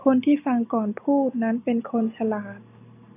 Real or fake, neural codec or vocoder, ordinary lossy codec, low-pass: real; none; AAC, 24 kbps; 3.6 kHz